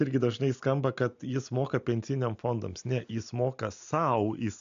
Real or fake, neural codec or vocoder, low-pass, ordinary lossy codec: real; none; 7.2 kHz; MP3, 64 kbps